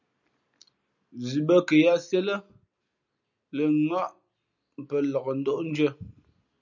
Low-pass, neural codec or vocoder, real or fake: 7.2 kHz; none; real